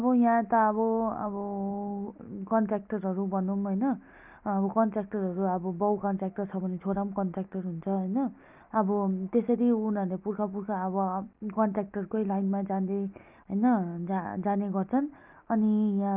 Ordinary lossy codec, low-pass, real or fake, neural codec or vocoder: Opus, 32 kbps; 3.6 kHz; real; none